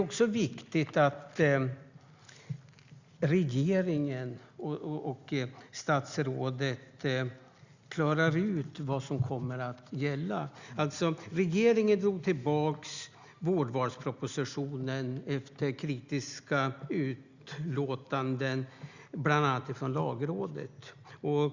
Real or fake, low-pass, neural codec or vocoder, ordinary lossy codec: real; 7.2 kHz; none; Opus, 64 kbps